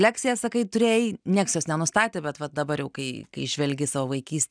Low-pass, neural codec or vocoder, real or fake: 9.9 kHz; none; real